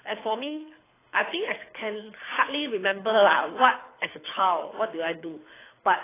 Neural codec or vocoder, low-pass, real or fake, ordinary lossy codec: codec, 24 kHz, 6 kbps, HILCodec; 3.6 kHz; fake; AAC, 16 kbps